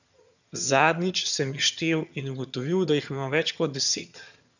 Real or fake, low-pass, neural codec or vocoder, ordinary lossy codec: fake; 7.2 kHz; vocoder, 22.05 kHz, 80 mel bands, HiFi-GAN; none